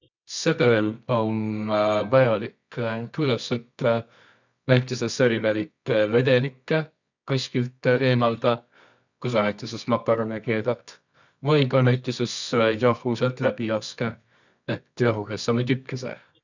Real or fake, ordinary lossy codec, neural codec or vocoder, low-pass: fake; none; codec, 24 kHz, 0.9 kbps, WavTokenizer, medium music audio release; 7.2 kHz